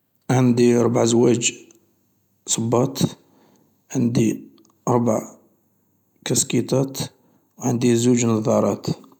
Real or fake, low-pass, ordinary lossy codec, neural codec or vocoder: real; 19.8 kHz; none; none